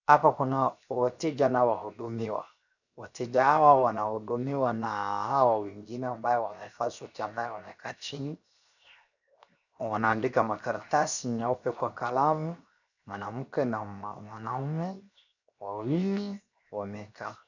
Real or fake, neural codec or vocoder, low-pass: fake; codec, 16 kHz, 0.7 kbps, FocalCodec; 7.2 kHz